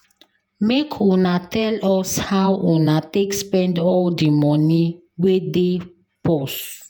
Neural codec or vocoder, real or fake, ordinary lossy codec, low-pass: vocoder, 48 kHz, 128 mel bands, Vocos; fake; none; none